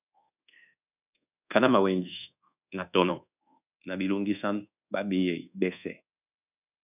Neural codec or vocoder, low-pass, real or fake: codec, 24 kHz, 1.2 kbps, DualCodec; 3.6 kHz; fake